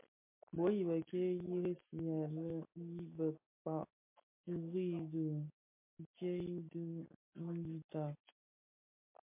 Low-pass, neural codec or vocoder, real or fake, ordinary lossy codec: 3.6 kHz; none; real; MP3, 24 kbps